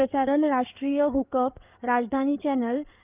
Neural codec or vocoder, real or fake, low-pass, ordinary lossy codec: codec, 16 kHz in and 24 kHz out, 2.2 kbps, FireRedTTS-2 codec; fake; 3.6 kHz; Opus, 24 kbps